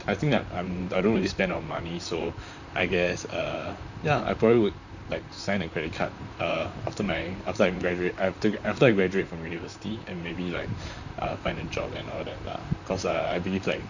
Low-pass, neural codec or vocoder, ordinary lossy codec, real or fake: 7.2 kHz; vocoder, 44.1 kHz, 128 mel bands, Pupu-Vocoder; none; fake